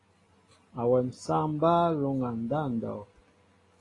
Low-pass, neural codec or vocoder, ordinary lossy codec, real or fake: 10.8 kHz; none; AAC, 32 kbps; real